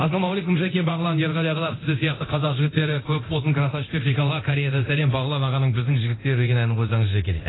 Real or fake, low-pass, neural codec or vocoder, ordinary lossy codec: fake; 7.2 kHz; codec, 24 kHz, 0.9 kbps, DualCodec; AAC, 16 kbps